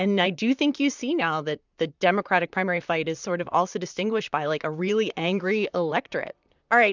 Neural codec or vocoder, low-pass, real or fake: vocoder, 44.1 kHz, 128 mel bands, Pupu-Vocoder; 7.2 kHz; fake